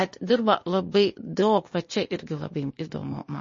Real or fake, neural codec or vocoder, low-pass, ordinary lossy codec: fake; codec, 16 kHz, 0.8 kbps, ZipCodec; 7.2 kHz; MP3, 32 kbps